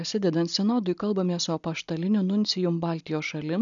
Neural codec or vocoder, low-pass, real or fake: none; 7.2 kHz; real